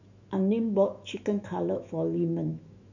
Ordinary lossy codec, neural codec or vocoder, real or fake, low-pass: none; autoencoder, 48 kHz, 128 numbers a frame, DAC-VAE, trained on Japanese speech; fake; 7.2 kHz